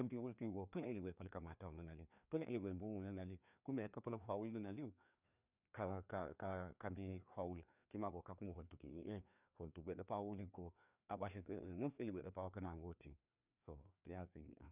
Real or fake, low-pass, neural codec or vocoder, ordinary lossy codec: fake; 3.6 kHz; codec, 16 kHz, 2 kbps, FreqCodec, larger model; none